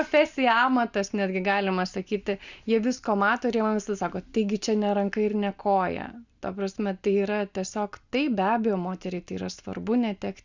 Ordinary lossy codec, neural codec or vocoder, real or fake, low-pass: Opus, 64 kbps; none; real; 7.2 kHz